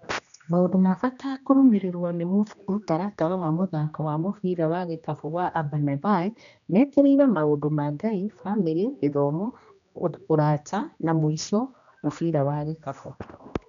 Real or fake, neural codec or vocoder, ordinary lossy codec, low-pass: fake; codec, 16 kHz, 1 kbps, X-Codec, HuBERT features, trained on general audio; none; 7.2 kHz